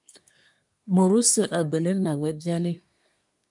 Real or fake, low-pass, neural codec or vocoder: fake; 10.8 kHz; codec, 24 kHz, 1 kbps, SNAC